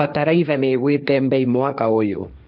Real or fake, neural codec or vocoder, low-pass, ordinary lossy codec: fake; codec, 16 kHz, 1.1 kbps, Voila-Tokenizer; 5.4 kHz; none